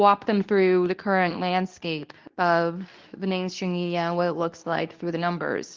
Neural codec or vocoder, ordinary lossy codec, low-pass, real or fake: codec, 24 kHz, 0.9 kbps, WavTokenizer, medium speech release version 2; Opus, 32 kbps; 7.2 kHz; fake